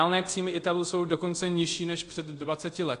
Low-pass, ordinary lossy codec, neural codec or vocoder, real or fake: 10.8 kHz; AAC, 48 kbps; codec, 24 kHz, 0.5 kbps, DualCodec; fake